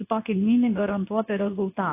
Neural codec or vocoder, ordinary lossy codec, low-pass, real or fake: codec, 24 kHz, 0.9 kbps, WavTokenizer, medium speech release version 2; AAC, 24 kbps; 3.6 kHz; fake